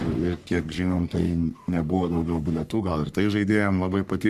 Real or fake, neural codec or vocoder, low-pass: fake; codec, 44.1 kHz, 3.4 kbps, Pupu-Codec; 14.4 kHz